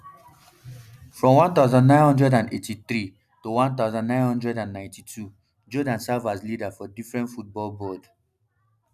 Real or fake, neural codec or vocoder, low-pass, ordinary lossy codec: real; none; 14.4 kHz; none